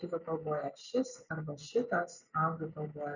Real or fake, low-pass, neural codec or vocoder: real; 7.2 kHz; none